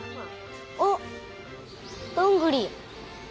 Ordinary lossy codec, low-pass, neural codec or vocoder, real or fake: none; none; none; real